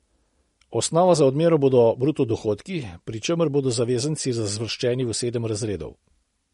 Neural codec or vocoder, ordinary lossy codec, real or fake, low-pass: none; MP3, 48 kbps; real; 14.4 kHz